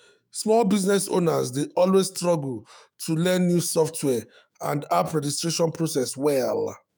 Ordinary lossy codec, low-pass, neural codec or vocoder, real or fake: none; none; autoencoder, 48 kHz, 128 numbers a frame, DAC-VAE, trained on Japanese speech; fake